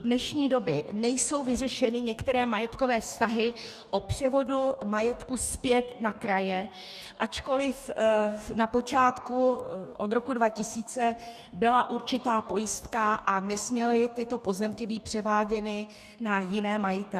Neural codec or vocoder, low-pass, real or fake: codec, 44.1 kHz, 2.6 kbps, DAC; 14.4 kHz; fake